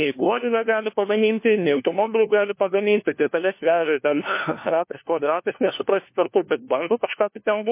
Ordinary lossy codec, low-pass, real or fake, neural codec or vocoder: MP3, 24 kbps; 3.6 kHz; fake; codec, 16 kHz, 1 kbps, FunCodec, trained on LibriTTS, 50 frames a second